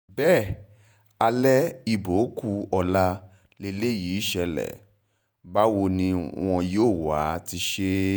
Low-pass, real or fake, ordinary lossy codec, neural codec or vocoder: none; fake; none; vocoder, 48 kHz, 128 mel bands, Vocos